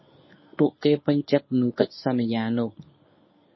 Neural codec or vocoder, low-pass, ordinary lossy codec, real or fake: codec, 24 kHz, 0.9 kbps, WavTokenizer, medium speech release version 1; 7.2 kHz; MP3, 24 kbps; fake